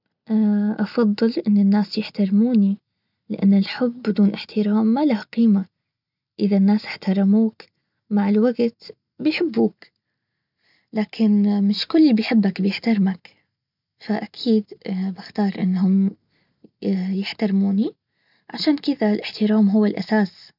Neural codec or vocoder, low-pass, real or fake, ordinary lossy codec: none; 5.4 kHz; real; none